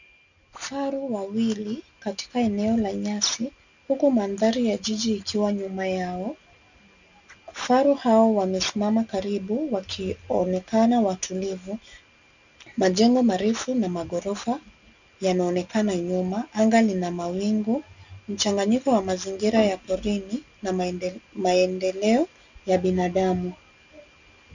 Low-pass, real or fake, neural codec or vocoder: 7.2 kHz; real; none